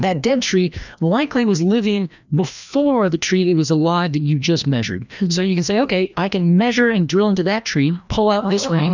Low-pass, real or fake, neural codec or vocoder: 7.2 kHz; fake; codec, 16 kHz, 1 kbps, FreqCodec, larger model